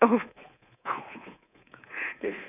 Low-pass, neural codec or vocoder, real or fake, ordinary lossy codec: 3.6 kHz; codec, 24 kHz, 3.1 kbps, DualCodec; fake; none